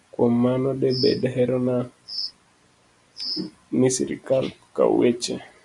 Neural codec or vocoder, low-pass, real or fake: none; 10.8 kHz; real